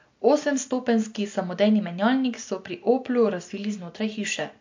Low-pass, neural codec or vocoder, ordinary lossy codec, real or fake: 7.2 kHz; none; MP3, 48 kbps; real